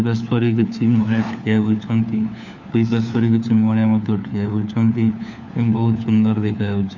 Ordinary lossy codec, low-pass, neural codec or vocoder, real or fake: none; 7.2 kHz; codec, 16 kHz, 4 kbps, FunCodec, trained on LibriTTS, 50 frames a second; fake